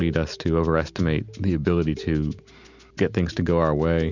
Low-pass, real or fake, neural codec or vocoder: 7.2 kHz; real; none